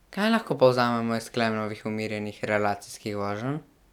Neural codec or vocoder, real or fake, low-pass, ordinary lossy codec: none; real; 19.8 kHz; none